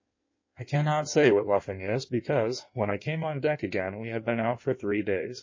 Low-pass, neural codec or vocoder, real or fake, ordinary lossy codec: 7.2 kHz; codec, 16 kHz in and 24 kHz out, 1.1 kbps, FireRedTTS-2 codec; fake; MP3, 32 kbps